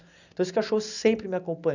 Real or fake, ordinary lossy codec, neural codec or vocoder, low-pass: real; none; none; 7.2 kHz